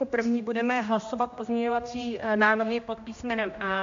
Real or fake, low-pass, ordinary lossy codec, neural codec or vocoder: fake; 7.2 kHz; MP3, 48 kbps; codec, 16 kHz, 1 kbps, X-Codec, HuBERT features, trained on general audio